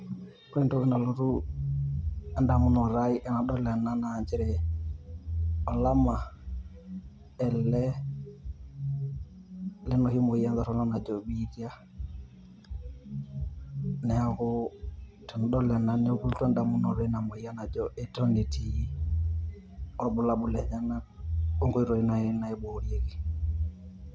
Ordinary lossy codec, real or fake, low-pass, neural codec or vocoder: none; real; none; none